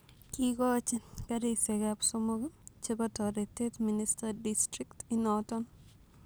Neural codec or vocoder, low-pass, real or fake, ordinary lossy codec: none; none; real; none